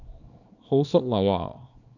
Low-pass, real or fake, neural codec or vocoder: 7.2 kHz; fake; codec, 24 kHz, 0.9 kbps, WavTokenizer, small release